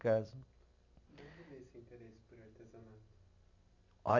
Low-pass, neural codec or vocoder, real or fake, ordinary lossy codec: 7.2 kHz; none; real; none